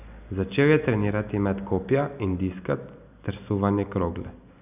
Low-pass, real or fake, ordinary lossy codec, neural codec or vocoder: 3.6 kHz; real; none; none